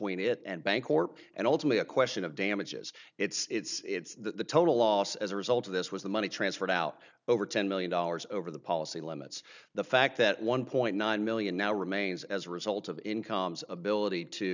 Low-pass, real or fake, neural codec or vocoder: 7.2 kHz; real; none